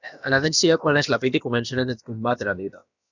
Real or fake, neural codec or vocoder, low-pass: fake; codec, 16 kHz, about 1 kbps, DyCAST, with the encoder's durations; 7.2 kHz